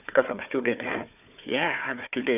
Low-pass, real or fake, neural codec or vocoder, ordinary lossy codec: 3.6 kHz; fake; codec, 16 kHz, 4 kbps, FunCodec, trained on Chinese and English, 50 frames a second; none